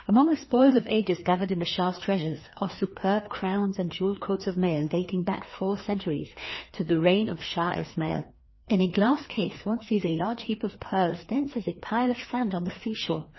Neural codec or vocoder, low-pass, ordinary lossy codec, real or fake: codec, 16 kHz, 2 kbps, FreqCodec, larger model; 7.2 kHz; MP3, 24 kbps; fake